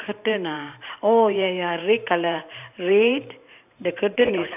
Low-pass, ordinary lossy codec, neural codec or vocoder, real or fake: 3.6 kHz; none; vocoder, 44.1 kHz, 128 mel bands every 512 samples, BigVGAN v2; fake